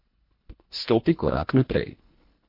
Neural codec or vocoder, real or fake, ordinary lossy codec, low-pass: codec, 24 kHz, 1.5 kbps, HILCodec; fake; MP3, 32 kbps; 5.4 kHz